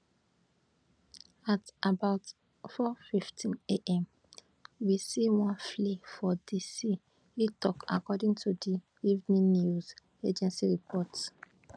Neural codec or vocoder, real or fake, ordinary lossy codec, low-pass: none; real; none; 9.9 kHz